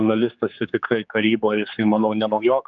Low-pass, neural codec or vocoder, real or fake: 7.2 kHz; codec, 16 kHz, 4 kbps, X-Codec, HuBERT features, trained on general audio; fake